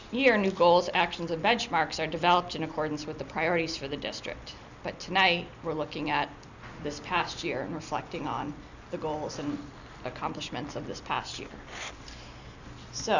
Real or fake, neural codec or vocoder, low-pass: real; none; 7.2 kHz